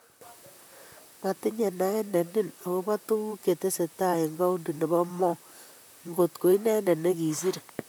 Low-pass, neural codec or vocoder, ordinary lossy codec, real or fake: none; vocoder, 44.1 kHz, 128 mel bands, Pupu-Vocoder; none; fake